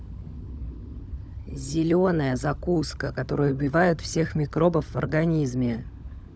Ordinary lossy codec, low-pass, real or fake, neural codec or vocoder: none; none; fake; codec, 16 kHz, 16 kbps, FunCodec, trained on LibriTTS, 50 frames a second